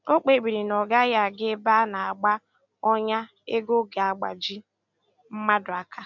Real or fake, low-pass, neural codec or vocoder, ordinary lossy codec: real; 7.2 kHz; none; none